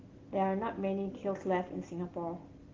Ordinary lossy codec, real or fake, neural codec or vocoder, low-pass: Opus, 16 kbps; real; none; 7.2 kHz